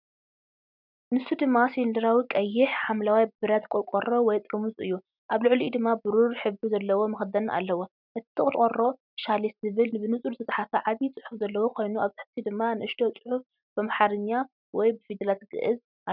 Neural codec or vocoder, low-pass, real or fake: none; 5.4 kHz; real